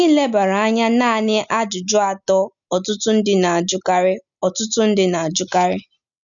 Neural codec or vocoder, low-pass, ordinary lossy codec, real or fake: none; 7.2 kHz; none; real